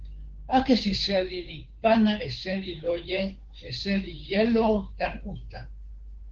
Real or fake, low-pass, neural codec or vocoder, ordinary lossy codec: fake; 7.2 kHz; codec, 16 kHz, 2 kbps, FunCodec, trained on Chinese and English, 25 frames a second; Opus, 16 kbps